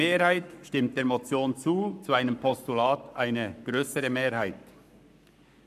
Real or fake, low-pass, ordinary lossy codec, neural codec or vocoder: fake; 14.4 kHz; none; codec, 44.1 kHz, 7.8 kbps, Pupu-Codec